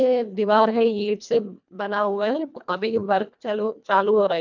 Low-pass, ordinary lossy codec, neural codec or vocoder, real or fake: 7.2 kHz; none; codec, 24 kHz, 1.5 kbps, HILCodec; fake